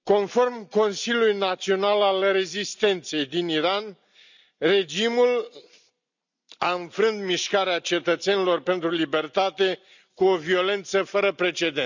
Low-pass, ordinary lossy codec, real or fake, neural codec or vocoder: 7.2 kHz; none; real; none